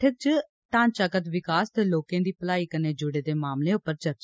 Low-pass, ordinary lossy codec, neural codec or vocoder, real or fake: none; none; none; real